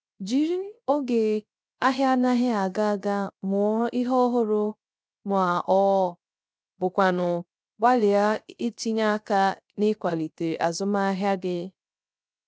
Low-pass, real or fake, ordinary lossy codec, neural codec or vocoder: none; fake; none; codec, 16 kHz, 0.3 kbps, FocalCodec